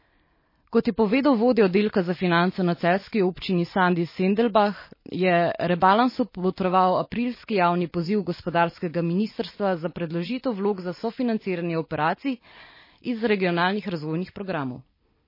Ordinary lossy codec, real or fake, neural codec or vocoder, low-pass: MP3, 24 kbps; real; none; 5.4 kHz